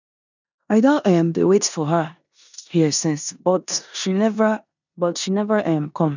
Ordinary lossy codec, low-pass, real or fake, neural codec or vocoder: none; 7.2 kHz; fake; codec, 16 kHz in and 24 kHz out, 0.9 kbps, LongCat-Audio-Codec, four codebook decoder